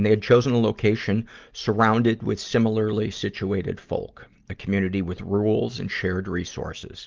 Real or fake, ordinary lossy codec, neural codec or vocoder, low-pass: real; Opus, 32 kbps; none; 7.2 kHz